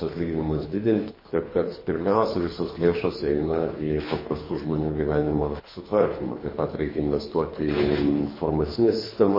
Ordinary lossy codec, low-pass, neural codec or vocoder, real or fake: MP3, 24 kbps; 5.4 kHz; codec, 24 kHz, 3 kbps, HILCodec; fake